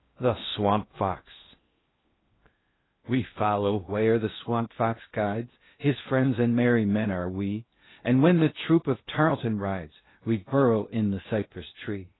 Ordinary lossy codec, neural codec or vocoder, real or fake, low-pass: AAC, 16 kbps; codec, 16 kHz in and 24 kHz out, 0.6 kbps, FocalCodec, streaming, 4096 codes; fake; 7.2 kHz